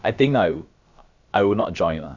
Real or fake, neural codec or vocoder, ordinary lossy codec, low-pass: fake; codec, 16 kHz, about 1 kbps, DyCAST, with the encoder's durations; Opus, 64 kbps; 7.2 kHz